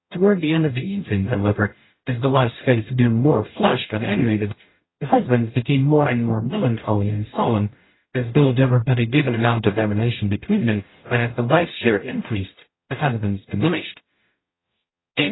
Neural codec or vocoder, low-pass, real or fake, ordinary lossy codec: codec, 44.1 kHz, 0.9 kbps, DAC; 7.2 kHz; fake; AAC, 16 kbps